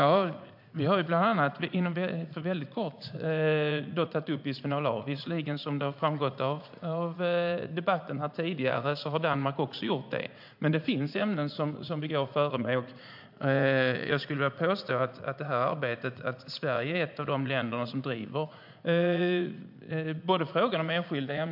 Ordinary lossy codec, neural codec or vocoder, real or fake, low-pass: MP3, 48 kbps; vocoder, 44.1 kHz, 80 mel bands, Vocos; fake; 5.4 kHz